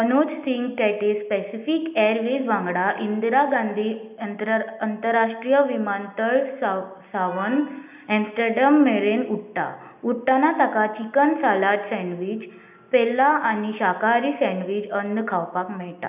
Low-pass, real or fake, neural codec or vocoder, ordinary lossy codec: 3.6 kHz; real; none; none